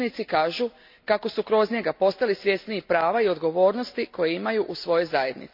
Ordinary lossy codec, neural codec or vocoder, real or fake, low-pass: MP3, 48 kbps; none; real; 5.4 kHz